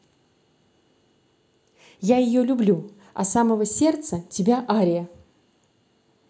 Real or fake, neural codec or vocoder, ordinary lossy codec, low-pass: real; none; none; none